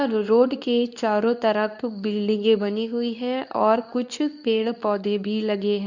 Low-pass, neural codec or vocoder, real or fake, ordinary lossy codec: 7.2 kHz; codec, 24 kHz, 0.9 kbps, WavTokenizer, medium speech release version 2; fake; none